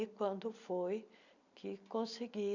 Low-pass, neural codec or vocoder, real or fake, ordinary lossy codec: 7.2 kHz; none; real; none